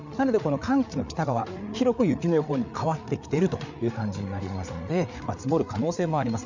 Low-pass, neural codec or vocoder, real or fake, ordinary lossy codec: 7.2 kHz; codec, 16 kHz, 8 kbps, FreqCodec, larger model; fake; none